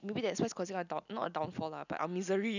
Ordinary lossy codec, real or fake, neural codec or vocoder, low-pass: none; real; none; 7.2 kHz